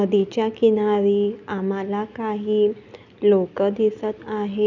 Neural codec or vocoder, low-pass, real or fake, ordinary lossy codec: none; 7.2 kHz; real; none